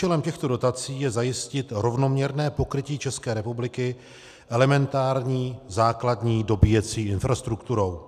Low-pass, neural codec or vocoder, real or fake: 14.4 kHz; none; real